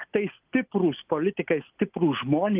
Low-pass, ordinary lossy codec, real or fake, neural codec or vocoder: 3.6 kHz; Opus, 24 kbps; real; none